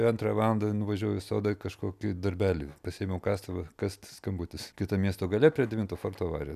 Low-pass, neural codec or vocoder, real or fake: 14.4 kHz; none; real